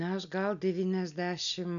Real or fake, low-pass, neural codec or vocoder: real; 7.2 kHz; none